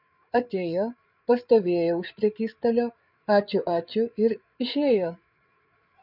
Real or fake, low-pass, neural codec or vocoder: fake; 5.4 kHz; codec, 16 kHz, 8 kbps, FreqCodec, larger model